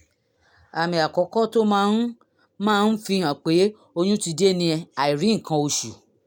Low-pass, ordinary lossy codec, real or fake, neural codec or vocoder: none; none; real; none